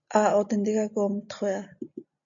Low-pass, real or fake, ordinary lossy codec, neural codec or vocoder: 7.2 kHz; real; MP3, 64 kbps; none